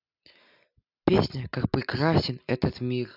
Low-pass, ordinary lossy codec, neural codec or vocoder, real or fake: 5.4 kHz; none; none; real